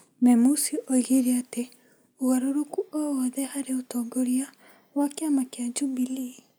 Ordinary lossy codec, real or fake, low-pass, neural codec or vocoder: none; real; none; none